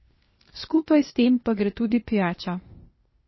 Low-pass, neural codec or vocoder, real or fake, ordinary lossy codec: 7.2 kHz; codec, 16 kHz, 0.8 kbps, ZipCodec; fake; MP3, 24 kbps